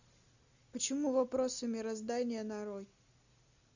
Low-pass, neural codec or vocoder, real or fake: 7.2 kHz; none; real